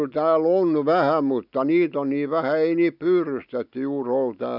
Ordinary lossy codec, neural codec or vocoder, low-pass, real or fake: none; none; 5.4 kHz; real